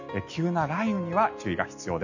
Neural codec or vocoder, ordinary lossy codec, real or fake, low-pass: none; none; real; 7.2 kHz